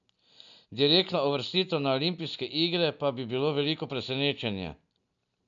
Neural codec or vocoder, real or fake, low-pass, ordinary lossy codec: none; real; 7.2 kHz; none